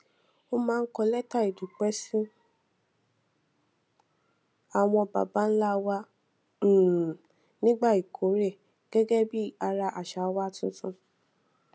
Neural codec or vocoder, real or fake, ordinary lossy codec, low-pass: none; real; none; none